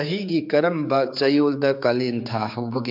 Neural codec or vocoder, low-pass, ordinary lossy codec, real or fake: codec, 16 kHz, 4 kbps, X-Codec, HuBERT features, trained on general audio; 5.4 kHz; MP3, 48 kbps; fake